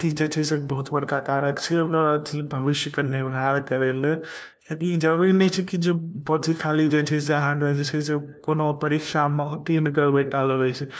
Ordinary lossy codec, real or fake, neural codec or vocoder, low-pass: none; fake; codec, 16 kHz, 1 kbps, FunCodec, trained on LibriTTS, 50 frames a second; none